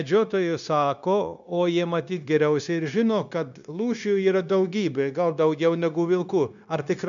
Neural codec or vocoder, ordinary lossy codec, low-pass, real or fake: codec, 16 kHz, 0.9 kbps, LongCat-Audio-Codec; MP3, 96 kbps; 7.2 kHz; fake